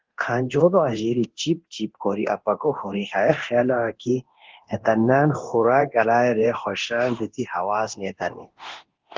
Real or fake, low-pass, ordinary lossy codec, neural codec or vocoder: fake; 7.2 kHz; Opus, 16 kbps; codec, 24 kHz, 0.9 kbps, DualCodec